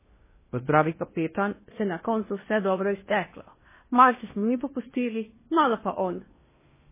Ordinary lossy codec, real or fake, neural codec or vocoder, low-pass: MP3, 16 kbps; fake; codec, 16 kHz in and 24 kHz out, 0.8 kbps, FocalCodec, streaming, 65536 codes; 3.6 kHz